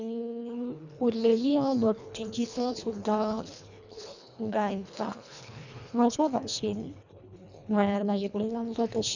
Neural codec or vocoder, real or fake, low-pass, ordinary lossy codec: codec, 24 kHz, 1.5 kbps, HILCodec; fake; 7.2 kHz; none